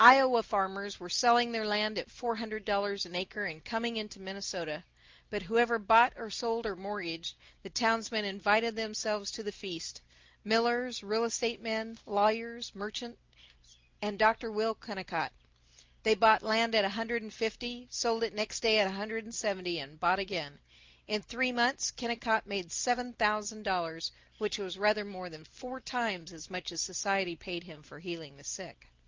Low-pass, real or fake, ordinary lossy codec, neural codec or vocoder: 7.2 kHz; real; Opus, 24 kbps; none